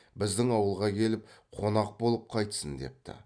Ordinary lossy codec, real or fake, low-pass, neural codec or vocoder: none; real; 9.9 kHz; none